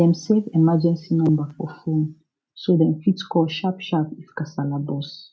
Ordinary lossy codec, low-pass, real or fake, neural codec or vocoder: none; none; real; none